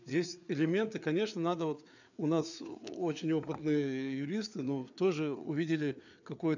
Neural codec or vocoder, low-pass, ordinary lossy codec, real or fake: codec, 16 kHz, 4 kbps, FreqCodec, larger model; 7.2 kHz; none; fake